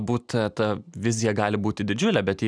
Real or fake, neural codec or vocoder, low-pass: real; none; 9.9 kHz